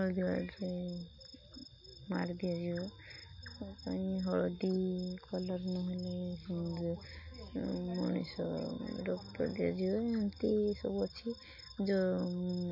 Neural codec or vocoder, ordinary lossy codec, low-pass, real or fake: none; MP3, 32 kbps; 5.4 kHz; real